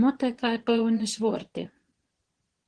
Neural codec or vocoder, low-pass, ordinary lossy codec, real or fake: vocoder, 22.05 kHz, 80 mel bands, Vocos; 9.9 kHz; Opus, 16 kbps; fake